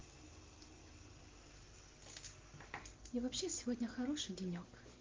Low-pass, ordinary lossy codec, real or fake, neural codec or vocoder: 7.2 kHz; Opus, 24 kbps; real; none